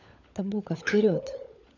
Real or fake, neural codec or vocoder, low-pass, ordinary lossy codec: fake; codec, 16 kHz, 16 kbps, FunCodec, trained on LibriTTS, 50 frames a second; 7.2 kHz; none